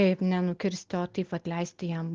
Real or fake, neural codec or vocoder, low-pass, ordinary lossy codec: real; none; 7.2 kHz; Opus, 16 kbps